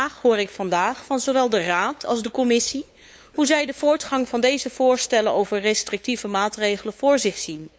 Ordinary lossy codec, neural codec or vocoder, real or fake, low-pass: none; codec, 16 kHz, 8 kbps, FunCodec, trained on LibriTTS, 25 frames a second; fake; none